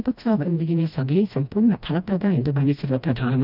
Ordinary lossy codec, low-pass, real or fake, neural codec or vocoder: none; 5.4 kHz; fake; codec, 16 kHz, 1 kbps, FreqCodec, smaller model